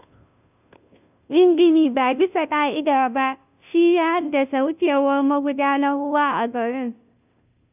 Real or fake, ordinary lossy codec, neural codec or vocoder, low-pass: fake; none; codec, 16 kHz, 0.5 kbps, FunCodec, trained on Chinese and English, 25 frames a second; 3.6 kHz